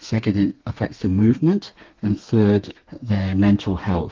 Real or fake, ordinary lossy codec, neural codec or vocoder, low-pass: fake; Opus, 32 kbps; codec, 32 kHz, 1.9 kbps, SNAC; 7.2 kHz